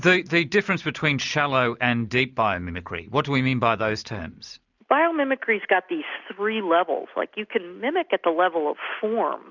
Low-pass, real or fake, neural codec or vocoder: 7.2 kHz; real; none